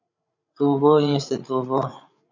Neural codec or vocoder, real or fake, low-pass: codec, 16 kHz, 8 kbps, FreqCodec, larger model; fake; 7.2 kHz